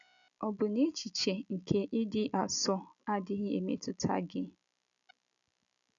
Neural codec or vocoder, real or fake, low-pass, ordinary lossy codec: none; real; 7.2 kHz; none